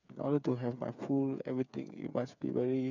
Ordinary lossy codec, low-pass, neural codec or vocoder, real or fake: none; 7.2 kHz; codec, 16 kHz, 8 kbps, FreqCodec, smaller model; fake